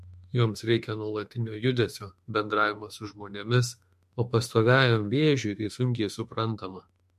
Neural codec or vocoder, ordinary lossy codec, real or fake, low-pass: autoencoder, 48 kHz, 32 numbers a frame, DAC-VAE, trained on Japanese speech; MP3, 64 kbps; fake; 14.4 kHz